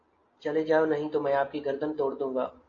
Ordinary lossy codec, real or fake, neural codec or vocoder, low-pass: AAC, 32 kbps; real; none; 7.2 kHz